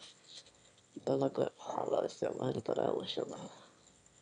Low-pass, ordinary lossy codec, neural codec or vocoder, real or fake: 9.9 kHz; none; autoencoder, 22.05 kHz, a latent of 192 numbers a frame, VITS, trained on one speaker; fake